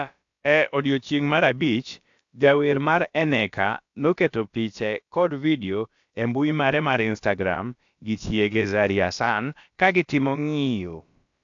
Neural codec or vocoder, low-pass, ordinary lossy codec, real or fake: codec, 16 kHz, about 1 kbps, DyCAST, with the encoder's durations; 7.2 kHz; AAC, 64 kbps; fake